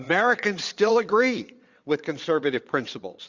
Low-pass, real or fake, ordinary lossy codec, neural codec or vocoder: 7.2 kHz; fake; Opus, 64 kbps; vocoder, 22.05 kHz, 80 mel bands, Vocos